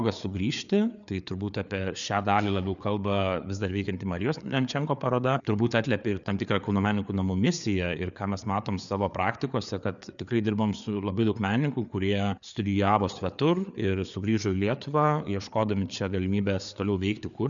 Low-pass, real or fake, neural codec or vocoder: 7.2 kHz; fake; codec, 16 kHz, 4 kbps, FreqCodec, larger model